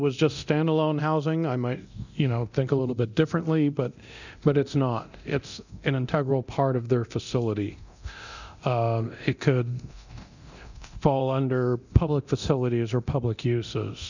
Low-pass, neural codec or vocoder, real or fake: 7.2 kHz; codec, 24 kHz, 0.9 kbps, DualCodec; fake